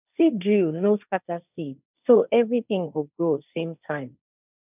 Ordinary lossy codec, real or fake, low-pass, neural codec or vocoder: none; fake; 3.6 kHz; codec, 16 kHz, 1.1 kbps, Voila-Tokenizer